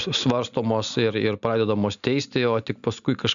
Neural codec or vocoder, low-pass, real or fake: none; 7.2 kHz; real